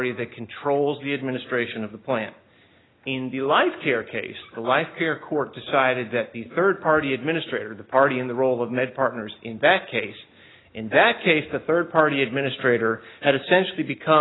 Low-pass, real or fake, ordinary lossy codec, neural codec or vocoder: 7.2 kHz; real; AAC, 16 kbps; none